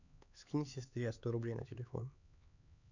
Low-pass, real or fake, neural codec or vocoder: 7.2 kHz; fake; codec, 16 kHz, 4 kbps, X-Codec, HuBERT features, trained on LibriSpeech